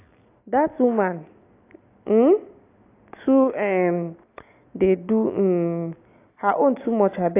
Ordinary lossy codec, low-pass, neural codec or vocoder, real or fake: AAC, 24 kbps; 3.6 kHz; none; real